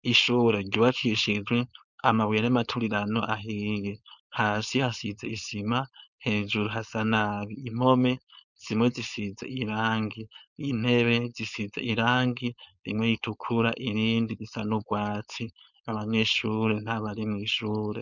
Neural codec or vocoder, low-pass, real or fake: codec, 16 kHz, 4.8 kbps, FACodec; 7.2 kHz; fake